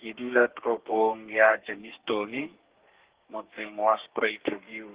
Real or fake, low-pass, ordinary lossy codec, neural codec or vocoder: fake; 3.6 kHz; Opus, 16 kbps; codec, 32 kHz, 1.9 kbps, SNAC